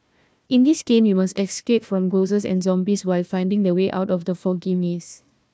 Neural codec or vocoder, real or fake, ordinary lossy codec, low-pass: codec, 16 kHz, 1 kbps, FunCodec, trained on Chinese and English, 50 frames a second; fake; none; none